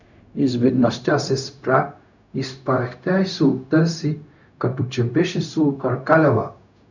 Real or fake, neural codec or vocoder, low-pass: fake; codec, 16 kHz, 0.4 kbps, LongCat-Audio-Codec; 7.2 kHz